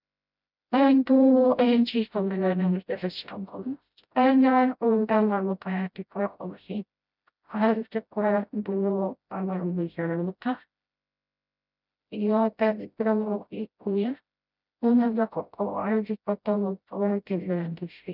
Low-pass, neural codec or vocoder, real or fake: 5.4 kHz; codec, 16 kHz, 0.5 kbps, FreqCodec, smaller model; fake